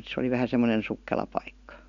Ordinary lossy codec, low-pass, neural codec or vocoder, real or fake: none; 7.2 kHz; none; real